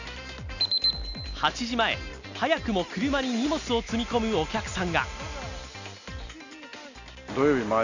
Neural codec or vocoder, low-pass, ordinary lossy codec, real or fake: none; 7.2 kHz; none; real